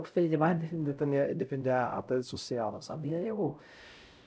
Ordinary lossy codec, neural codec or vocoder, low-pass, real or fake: none; codec, 16 kHz, 0.5 kbps, X-Codec, HuBERT features, trained on LibriSpeech; none; fake